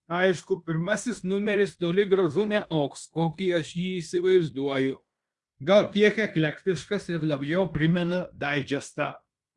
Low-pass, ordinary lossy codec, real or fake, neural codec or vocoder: 10.8 kHz; Opus, 64 kbps; fake; codec, 16 kHz in and 24 kHz out, 0.9 kbps, LongCat-Audio-Codec, fine tuned four codebook decoder